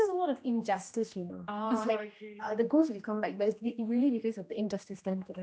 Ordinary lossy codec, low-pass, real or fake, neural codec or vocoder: none; none; fake; codec, 16 kHz, 1 kbps, X-Codec, HuBERT features, trained on general audio